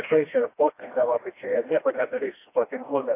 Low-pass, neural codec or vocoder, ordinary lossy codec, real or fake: 3.6 kHz; codec, 16 kHz, 1 kbps, FreqCodec, smaller model; AAC, 24 kbps; fake